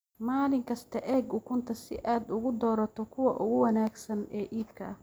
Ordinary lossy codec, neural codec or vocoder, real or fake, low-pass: none; none; real; none